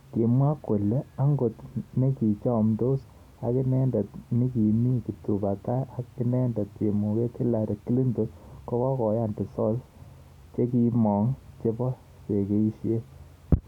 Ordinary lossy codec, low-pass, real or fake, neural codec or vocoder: none; 19.8 kHz; real; none